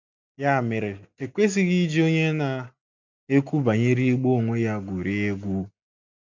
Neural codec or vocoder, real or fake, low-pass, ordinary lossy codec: none; real; 7.2 kHz; MP3, 64 kbps